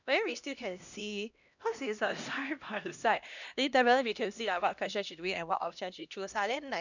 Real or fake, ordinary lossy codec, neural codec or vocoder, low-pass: fake; none; codec, 16 kHz, 1 kbps, X-Codec, HuBERT features, trained on LibriSpeech; 7.2 kHz